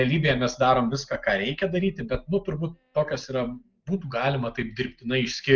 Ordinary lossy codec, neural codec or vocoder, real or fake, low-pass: Opus, 24 kbps; none; real; 7.2 kHz